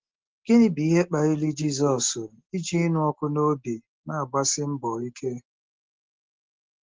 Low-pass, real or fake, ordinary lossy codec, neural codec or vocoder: 7.2 kHz; real; Opus, 16 kbps; none